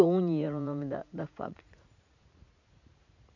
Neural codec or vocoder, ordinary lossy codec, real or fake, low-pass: vocoder, 44.1 kHz, 80 mel bands, Vocos; none; fake; 7.2 kHz